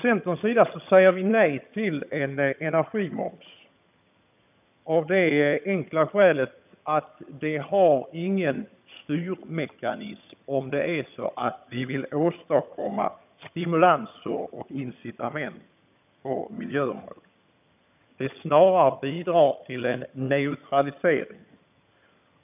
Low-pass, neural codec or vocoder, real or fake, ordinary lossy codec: 3.6 kHz; vocoder, 22.05 kHz, 80 mel bands, HiFi-GAN; fake; none